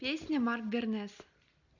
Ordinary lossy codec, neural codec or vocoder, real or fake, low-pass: none; none; real; 7.2 kHz